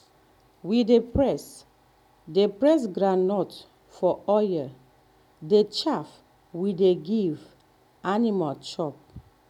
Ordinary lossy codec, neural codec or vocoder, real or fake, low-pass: none; none; real; 19.8 kHz